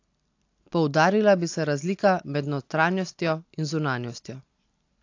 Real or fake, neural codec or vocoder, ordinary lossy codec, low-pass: real; none; AAC, 48 kbps; 7.2 kHz